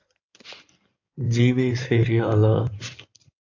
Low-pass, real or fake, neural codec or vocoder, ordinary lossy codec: 7.2 kHz; fake; codec, 16 kHz in and 24 kHz out, 2.2 kbps, FireRedTTS-2 codec; AAC, 48 kbps